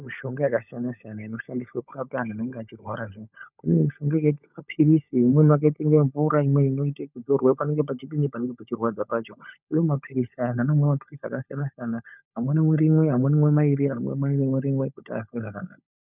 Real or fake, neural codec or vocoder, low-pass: fake; codec, 16 kHz, 16 kbps, FunCodec, trained on LibriTTS, 50 frames a second; 3.6 kHz